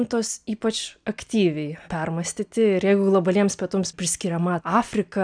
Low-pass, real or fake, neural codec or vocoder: 9.9 kHz; real; none